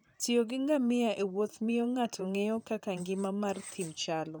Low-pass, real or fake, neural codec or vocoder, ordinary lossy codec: none; fake; vocoder, 44.1 kHz, 128 mel bands, Pupu-Vocoder; none